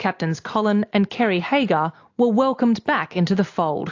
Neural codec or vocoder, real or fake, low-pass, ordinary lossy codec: none; real; 7.2 kHz; AAC, 48 kbps